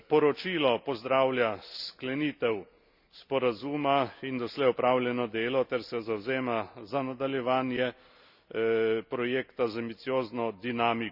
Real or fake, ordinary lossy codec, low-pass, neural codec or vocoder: real; none; 5.4 kHz; none